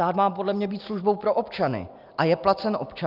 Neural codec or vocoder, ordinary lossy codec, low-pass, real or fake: none; Opus, 24 kbps; 5.4 kHz; real